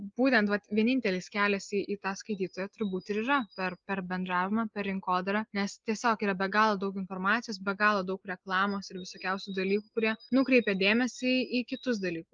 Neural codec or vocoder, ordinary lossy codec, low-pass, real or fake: none; Opus, 32 kbps; 7.2 kHz; real